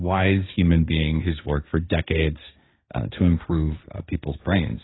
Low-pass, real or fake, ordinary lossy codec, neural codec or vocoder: 7.2 kHz; fake; AAC, 16 kbps; codec, 16 kHz, 1.1 kbps, Voila-Tokenizer